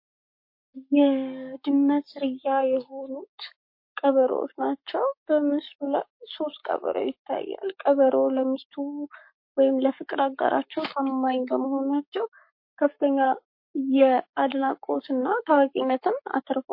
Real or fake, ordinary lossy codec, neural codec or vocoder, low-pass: fake; MP3, 32 kbps; codec, 44.1 kHz, 7.8 kbps, Pupu-Codec; 5.4 kHz